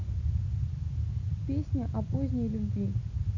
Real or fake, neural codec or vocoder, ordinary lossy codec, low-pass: real; none; none; 7.2 kHz